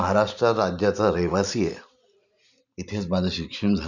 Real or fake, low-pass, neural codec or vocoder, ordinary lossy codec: fake; 7.2 kHz; vocoder, 44.1 kHz, 128 mel bands every 512 samples, BigVGAN v2; none